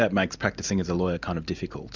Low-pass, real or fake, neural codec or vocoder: 7.2 kHz; real; none